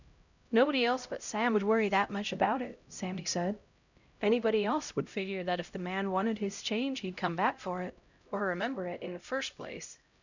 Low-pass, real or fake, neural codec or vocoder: 7.2 kHz; fake; codec, 16 kHz, 0.5 kbps, X-Codec, HuBERT features, trained on LibriSpeech